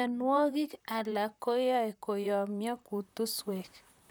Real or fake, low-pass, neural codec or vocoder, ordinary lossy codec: fake; none; vocoder, 44.1 kHz, 128 mel bands, Pupu-Vocoder; none